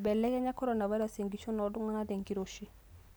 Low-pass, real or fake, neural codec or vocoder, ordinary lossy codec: none; real; none; none